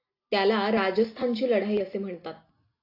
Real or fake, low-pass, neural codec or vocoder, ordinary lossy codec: real; 5.4 kHz; none; AAC, 32 kbps